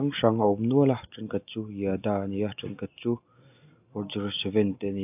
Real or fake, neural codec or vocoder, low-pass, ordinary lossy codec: real; none; 3.6 kHz; AAC, 32 kbps